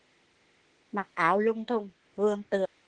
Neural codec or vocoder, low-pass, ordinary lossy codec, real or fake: autoencoder, 48 kHz, 32 numbers a frame, DAC-VAE, trained on Japanese speech; 9.9 kHz; Opus, 16 kbps; fake